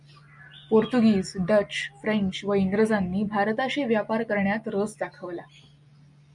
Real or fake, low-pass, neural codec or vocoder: real; 10.8 kHz; none